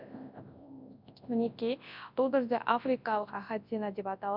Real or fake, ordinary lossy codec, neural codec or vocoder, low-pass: fake; MP3, 48 kbps; codec, 24 kHz, 0.9 kbps, WavTokenizer, large speech release; 5.4 kHz